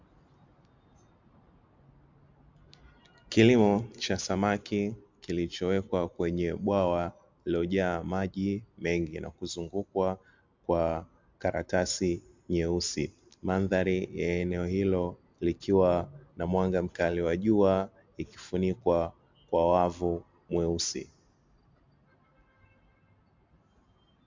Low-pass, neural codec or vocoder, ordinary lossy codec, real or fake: 7.2 kHz; none; MP3, 64 kbps; real